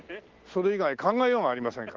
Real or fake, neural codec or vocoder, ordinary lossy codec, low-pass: real; none; Opus, 16 kbps; 7.2 kHz